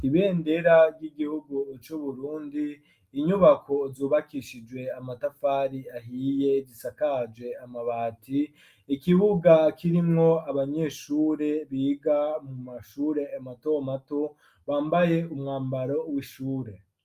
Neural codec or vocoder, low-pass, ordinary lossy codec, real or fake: none; 14.4 kHz; Opus, 32 kbps; real